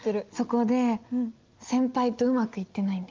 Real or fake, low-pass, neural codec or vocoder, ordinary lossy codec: real; 7.2 kHz; none; Opus, 24 kbps